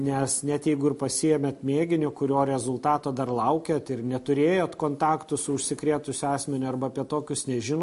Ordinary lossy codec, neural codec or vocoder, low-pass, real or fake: MP3, 48 kbps; none; 14.4 kHz; real